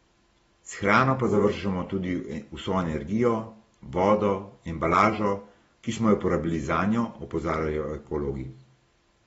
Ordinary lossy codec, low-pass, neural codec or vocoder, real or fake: AAC, 24 kbps; 19.8 kHz; none; real